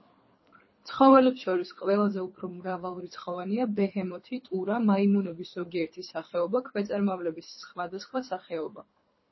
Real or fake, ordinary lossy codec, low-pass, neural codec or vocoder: fake; MP3, 24 kbps; 7.2 kHz; codec, 24 kHz, 3 kbps, HILCodec